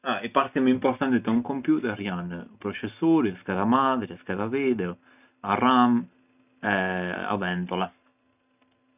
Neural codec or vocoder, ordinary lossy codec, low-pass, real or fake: none; none; 3.6 kHz; real